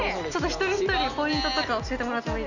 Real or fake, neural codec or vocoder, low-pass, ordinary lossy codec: real; none; 7.2 kHz; none